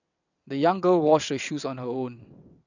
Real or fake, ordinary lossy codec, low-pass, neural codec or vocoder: fake; none; 7.2 kHz; vocoder, 22.05 kHz, 80 mel bands, WaveNeXt